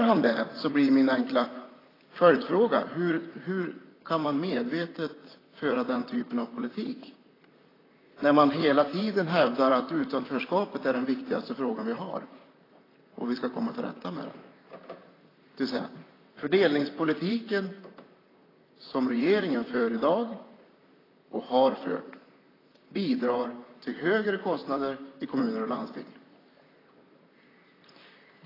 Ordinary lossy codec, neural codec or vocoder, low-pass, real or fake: AAC, 24 kbps; vocoder, 44.1 kHz, 128 mel bands, Pupu-Vocoder; 5.4 kHz; fake